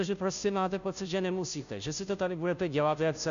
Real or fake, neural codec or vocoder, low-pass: fake; codec, 16 kHz, 0.5 kbps, FunCodec, trained on Chinese and English, 25 frames a second; 7.2 kHz